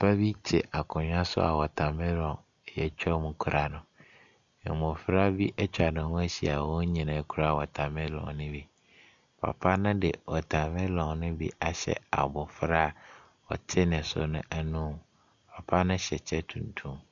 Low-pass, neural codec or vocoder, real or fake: 7.2 kHz; none; real